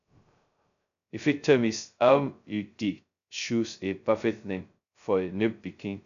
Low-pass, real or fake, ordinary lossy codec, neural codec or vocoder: 7.2 kHz; fake; none; codec, 16 kHz, 0.2 kbps, FocalCodec